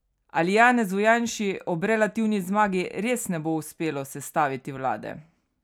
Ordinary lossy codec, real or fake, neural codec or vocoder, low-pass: none; real; none; 19.8 kHz